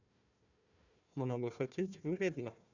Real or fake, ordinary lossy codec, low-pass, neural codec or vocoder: fake; none; 7.2 kHz; codec, 16 kHz, 1 kbps, FunCodec, trained on Chinese and English, 50 frames a second